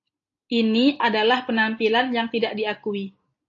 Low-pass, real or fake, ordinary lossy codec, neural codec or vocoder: 7.2 kHz; real; AAC, 48 kbps; none